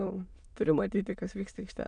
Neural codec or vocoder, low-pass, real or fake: autoencoder, 22.05 kHz, a latent of 192 numbers a frame, VITS, trained on many speakers; 9.9 kHz; fake